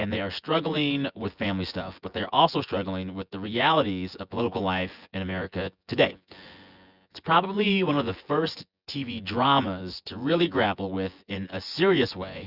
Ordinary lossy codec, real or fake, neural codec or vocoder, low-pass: Opus, 64 kbps; fake; vocoder, 24 kHz, 100 mel bands, Vocos; 5.4 kHz